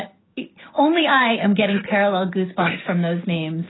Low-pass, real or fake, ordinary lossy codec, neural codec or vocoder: 7.2 kHz; fake; AAC, 16 kbps; codec, 16 kHz, 6 kbps, DAC